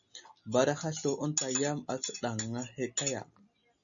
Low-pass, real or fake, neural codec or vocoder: 7.2 kHz; real; none